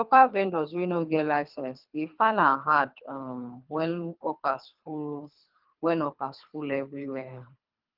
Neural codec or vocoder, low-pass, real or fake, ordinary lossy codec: codec, 24 kHz, 3 kbps, HILCodec; 5.4 kHz; fake; Opus, 24 kbps